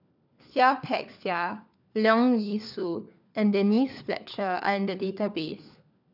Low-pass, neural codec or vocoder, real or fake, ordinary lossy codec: 5.4 kHz; codec, 16 kHz, 4 kbps, FunCodec, trained on LibriTTS, 50 frames a second; fake; none